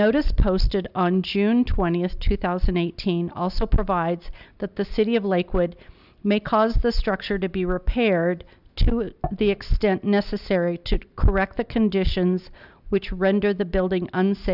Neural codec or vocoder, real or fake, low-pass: none; real; 5.4 kHz